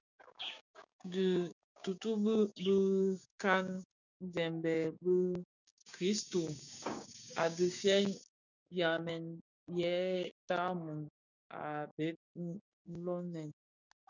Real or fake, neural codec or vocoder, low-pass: fake; codec, 16 kHz, 6 kbps, DAC; 7.2 kHz